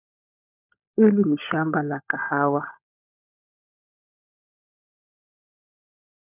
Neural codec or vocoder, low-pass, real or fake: codec, 16 kHz, 16 kbps, FunCodec, trained on LibriTTS, 50 frames a second; 3.6 kHz; fake